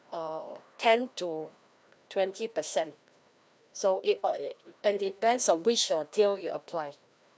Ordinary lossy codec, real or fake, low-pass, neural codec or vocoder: none; fake; none; codec, 16 kHz, 1 kbps, FreqCodec, larger model